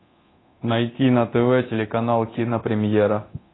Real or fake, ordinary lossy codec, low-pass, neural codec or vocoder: fake; AAC, 16 kbps; 7.2 kHz; codec, 24 kHz, 0.9 kbps, DualCodec